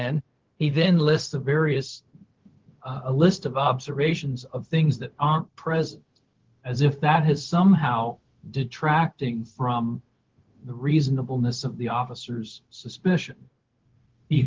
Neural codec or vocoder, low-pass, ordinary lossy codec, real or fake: codec, 16 kHz, 0.4 kbps, LongCat-Audio-Codec; 7.2 kHz; Opus, 16 kbps; fake